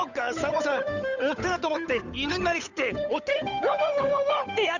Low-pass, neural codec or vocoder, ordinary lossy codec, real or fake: 7.2 kHz; codec, 16 kHz, 8 kbps, FunCodec, trained on Chinese and English, 25 frames a second; none; fake